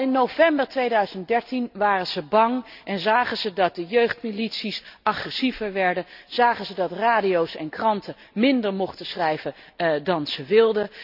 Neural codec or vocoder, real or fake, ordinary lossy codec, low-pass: none; real; none; 5.4 kHz